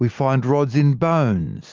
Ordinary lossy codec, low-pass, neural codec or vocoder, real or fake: Opus, 24 kbps; 7.2 kHz; none; real